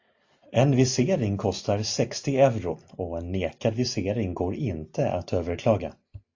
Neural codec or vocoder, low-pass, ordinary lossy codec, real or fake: none; 7.2 kHz; AAC, 48 kbps; real